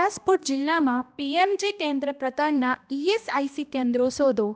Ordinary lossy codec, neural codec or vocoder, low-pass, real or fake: none; codec, 16 kHz, 1 kbps, X-Codec, HuBERT features, trained on balanced general audio; none; fake